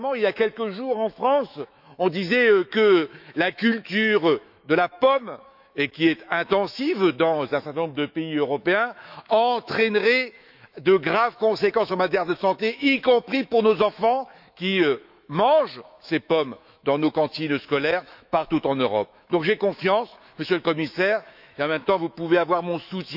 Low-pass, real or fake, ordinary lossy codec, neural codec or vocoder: 5.4 kHz; fake; none; autoencoder, 48 kHz, 128 numbers a frame, DAC-VAE, trained on Japanese speech